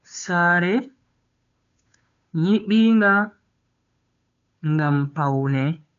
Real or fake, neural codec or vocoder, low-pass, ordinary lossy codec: fake; codec, 16 kHz, 2 kbps, FunCodec, trained on Chinese and English, 25 frames a second; 7.2 kHz; MP3, 64 kbps